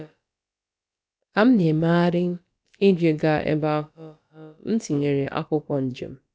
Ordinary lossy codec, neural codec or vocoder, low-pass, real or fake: none; codec, 16 kHz, about 1 kbps, DyCAST, with the encoder's durations; none; fake